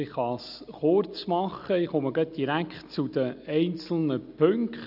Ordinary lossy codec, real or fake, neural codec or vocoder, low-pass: none; real; none; 5.4 kHz